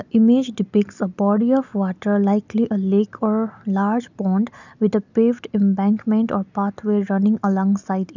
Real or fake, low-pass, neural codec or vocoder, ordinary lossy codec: real; 7.2 kHz; none; none